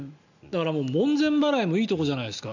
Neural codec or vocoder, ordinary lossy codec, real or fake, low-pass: none; none; real; 7.2 kHz